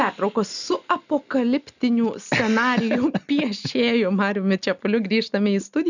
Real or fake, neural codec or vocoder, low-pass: real; none; 7.2 kHz